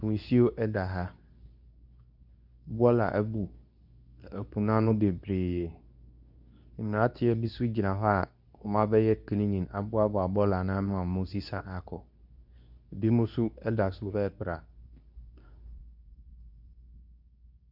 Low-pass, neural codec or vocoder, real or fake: 5.4 kHz; codec, 24 kHz, 0.9 kbps, WavTokenizer, medium speech release version 2; fake